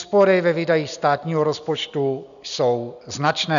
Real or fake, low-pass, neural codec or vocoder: real; 7.2 kHz; none